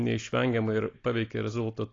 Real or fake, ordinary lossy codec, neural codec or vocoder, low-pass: real; AAC, 32 kbps; none; 7.2 kHz